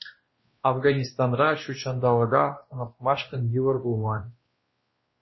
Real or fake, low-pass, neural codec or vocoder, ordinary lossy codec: fake; 7.2 kHz; codec, 16 kHz, 1 kbps, X-Codec, WavLM features, trained on Multilingual LibriSpeech; MP3, 24 kbps